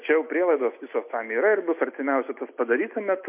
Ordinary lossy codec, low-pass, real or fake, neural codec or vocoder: MP3, 32 kbps; 3.6 kHz; real; none